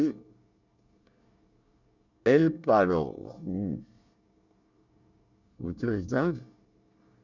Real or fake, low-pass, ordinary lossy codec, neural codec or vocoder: fake; 7.2 kHz; none; codec, 24 kHz, 1 kbps, SNAC